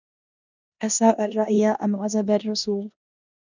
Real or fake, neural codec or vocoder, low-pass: fake; codec, 16 kHz in and 24 kHz out, 0.9 kbps, LongCat-Audio-Codec, four codebook decoder; 7.2 kHz